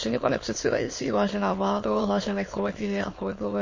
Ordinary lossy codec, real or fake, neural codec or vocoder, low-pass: MP3, 32 kbps; fake; autoencoder, 22.05 kHz, a latent of 192 numbers a frame, VITS, trained on many speakers; 7.2 kHz